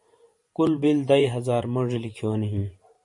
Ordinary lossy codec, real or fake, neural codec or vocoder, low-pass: MP3, 48 kbps; fake; vocoder, 44.1 kHz, 128 mel bands every 512 samples, BigVGAN v2; 10.8 kHz